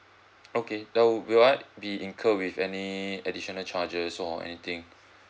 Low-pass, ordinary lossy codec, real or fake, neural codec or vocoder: none; none; real; none